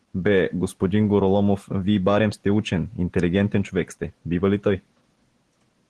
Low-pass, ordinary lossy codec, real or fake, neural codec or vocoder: 10.8 kHz; Opus, 16 kbps; real; none